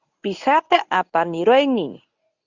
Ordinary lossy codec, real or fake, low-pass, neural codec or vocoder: Opus, 64 kbps; fake; 7.2 kHz; codec, 24 kHz, 0.9 kbps, WavTokenizer, medium speech release version 1